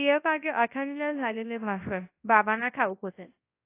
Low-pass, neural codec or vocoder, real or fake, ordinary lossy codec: 3.6 kHz; codec, 24 kHz, 0.9 kbps, WavTokenizer, large speech release; fake; AAC, 24 kbps